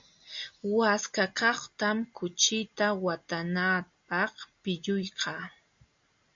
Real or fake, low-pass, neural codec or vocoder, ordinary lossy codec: real; 7.2 kHz; none; AAC, 64 kbps